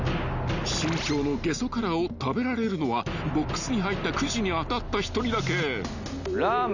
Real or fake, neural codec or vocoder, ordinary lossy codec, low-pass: real; none; none; 7.2 kHz